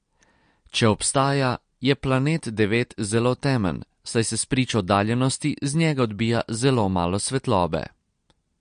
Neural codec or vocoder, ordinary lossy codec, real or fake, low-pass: none; MP3, 48 kbps; real; 9.9 kHz